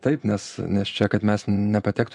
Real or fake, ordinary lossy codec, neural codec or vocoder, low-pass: fake; MP3, 96 kbps; vocoder, 24 kHz, 100 mel bands, Vocos; 10.8 kHz